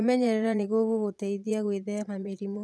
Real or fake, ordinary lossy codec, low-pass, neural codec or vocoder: fake; none; none; vocoder, 22.05 kHz, 80 mel bands, Vocos